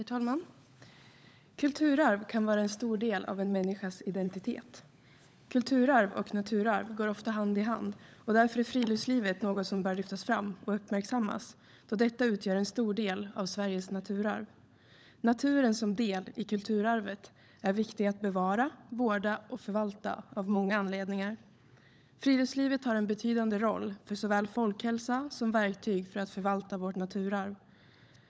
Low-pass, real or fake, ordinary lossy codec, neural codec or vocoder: none; fake; none; codec, 16 kHz, 16 kbps, FunCodec, trained on LibriTTS, 50 frames a second